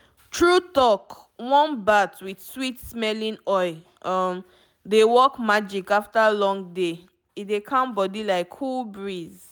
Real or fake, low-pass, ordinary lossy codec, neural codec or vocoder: real; none; none; none